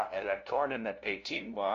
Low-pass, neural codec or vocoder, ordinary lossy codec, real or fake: 7.2 kHz; codec, 16 kHz, 0.5 kbps, FunCodec, trained on LibriTTS, 25 frames a second; AAC, 48 kbps; fake